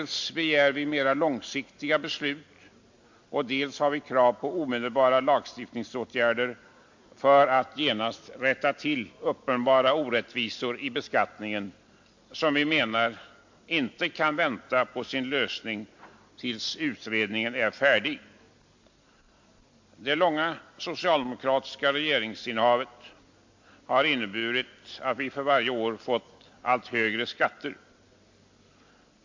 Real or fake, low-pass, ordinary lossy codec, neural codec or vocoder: real; 7.2 kHz; MP3, 48 kbps; none